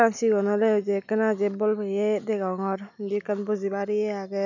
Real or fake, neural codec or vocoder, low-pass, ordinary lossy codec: real; none; 7.2 kHz; none